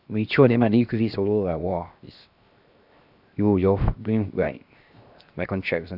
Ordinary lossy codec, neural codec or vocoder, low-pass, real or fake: none; codec, 16 kHz, 0.7 kbps, FocalCodec; 5.4 kHz; fake